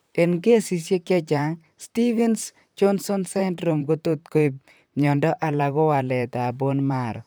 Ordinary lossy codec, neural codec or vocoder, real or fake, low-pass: none; vocoder, 44.1 kHz, 128 mel bands, Pupu-Vocoder; fake; none